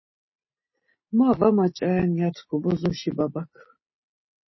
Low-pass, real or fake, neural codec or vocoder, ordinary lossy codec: 7.2 kHz; real; none; MP3, 24 kbps